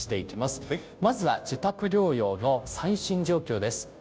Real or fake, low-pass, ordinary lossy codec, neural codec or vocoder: fake; none; none; codec, 16 kHz, 0.5 kbps, FunCodec, trained on Chinese and English, 25 frames a second